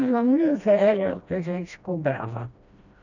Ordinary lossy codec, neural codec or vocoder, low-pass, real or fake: none; codec, 16 kHz, 1 kbps, FreqCodec, smaller model; 7.2 kHz; fake